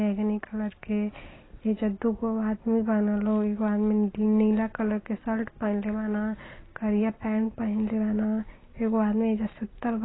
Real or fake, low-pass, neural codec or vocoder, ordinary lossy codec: real; 7.2 kHz; none; AAC, 16 kbps